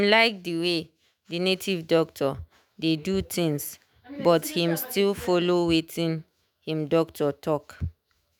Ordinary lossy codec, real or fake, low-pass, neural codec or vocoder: none; fake; none; autoencoder, 48 kHz, 128 numbers a frame, DAC-VAE, trained on Japanese speech